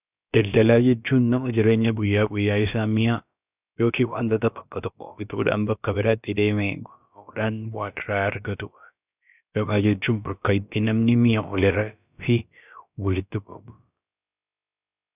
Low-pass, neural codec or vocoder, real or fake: 3.6 kHz; codec, 16 kHz, about 1 kbps, DyCAST, with the encoder's durations; fake